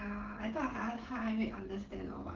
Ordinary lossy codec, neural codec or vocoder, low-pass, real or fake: Opus, 16 kbps; codec, 16 kHz, 16 kbps, FreqCodec, smaller model; 7.2 kHz; fake